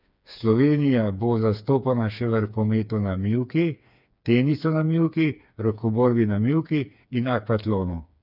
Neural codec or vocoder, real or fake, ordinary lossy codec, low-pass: codec, 16 kHz, 4 kbps, FreqCodec, smaller model; fake; none; 5.4 kHz